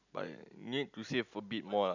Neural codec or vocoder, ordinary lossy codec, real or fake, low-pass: none; none; real; 7.2 kHz